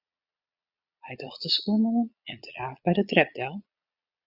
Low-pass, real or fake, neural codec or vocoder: 5.4 kHz; fake; vocoder, 22.05 kHz, 80 mel bands, Vocos